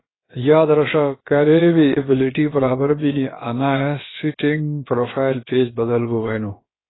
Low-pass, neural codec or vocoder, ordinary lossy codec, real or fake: 7.2 kHz; codec, 16 kHz, about 1 kbps, DyCAST, with the encoder's durations; AAC, 16 kbps; fake